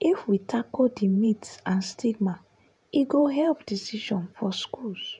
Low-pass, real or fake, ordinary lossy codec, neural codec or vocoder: 10.8 kHz; fake; none; vocoder, 48 kHz, 128 mel bands, Vocos